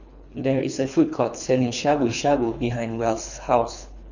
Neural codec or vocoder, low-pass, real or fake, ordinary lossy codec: codec, 24 kHz, 3 kbps, HILCodec; 7.2 kHz; fake; none